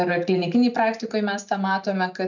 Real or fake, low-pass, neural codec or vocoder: real; 7.2 kHz; none